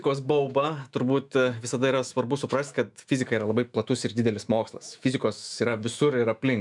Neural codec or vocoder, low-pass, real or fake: autoencoder, 48 kHz, 128 numbers a frame, DAC-VAE, trained on Japanese speech; 10.8 kHz; fake